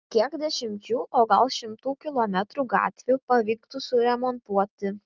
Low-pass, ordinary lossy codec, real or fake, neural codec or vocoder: 7.2 kHz; Opus, 32 kbps; real; none